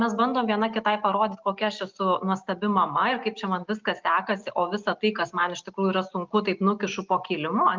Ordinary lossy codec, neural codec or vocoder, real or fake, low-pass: Opus, 24 kbps; none; real; 7.2 kHz